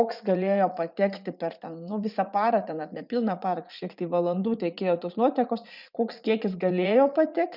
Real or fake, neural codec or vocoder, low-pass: fake; vocoder, 44.1 kHz, 80 mel bands, Vocos; 5.4 kHz